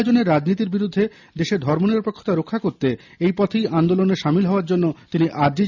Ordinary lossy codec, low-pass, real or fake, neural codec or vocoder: none; 7.2 kHz; real; none